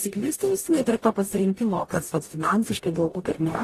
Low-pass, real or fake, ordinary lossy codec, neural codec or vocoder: 14.4 kHz; fake; AAC, 48 kbps; codec, 44.1 kHz, 0.9 kbps, DAC